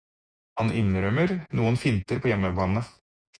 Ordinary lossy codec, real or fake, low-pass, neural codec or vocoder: Opus, 64 kbps; fake; 9.9 kHz; vocoder, 48 kHz, 128 mel bands, Vocos